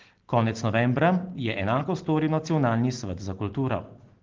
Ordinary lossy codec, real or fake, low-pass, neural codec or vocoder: Opus, 16 kbps; real; 7.2 kHz; none